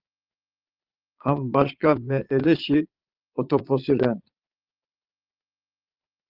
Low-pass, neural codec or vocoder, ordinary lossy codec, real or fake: 5.4 kHz; vocoder, 22.05 kHz, 80 mel bands, Vocos; Opus, 32 kbps; fake